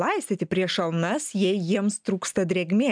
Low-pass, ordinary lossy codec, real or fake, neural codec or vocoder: 9.9 kHz; MP3, 96 kbps; real; none